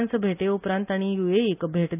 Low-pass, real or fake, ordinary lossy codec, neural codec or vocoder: 3.6 kHz; real; none; none